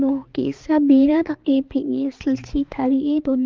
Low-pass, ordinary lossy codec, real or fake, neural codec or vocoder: 7.2 kHz; Opus, 32 kbps; fake; codec, 16 kHz, 4 kbps, X-Codec, HuBERT features, trained on balanced general audio